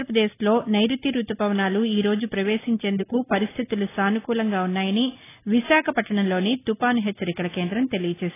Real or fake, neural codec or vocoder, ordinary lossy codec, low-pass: real; none; AAC, 16 kbps; 3.6 kHz